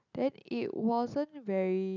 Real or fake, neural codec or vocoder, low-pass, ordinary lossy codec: real; none; 7.2 kHz; none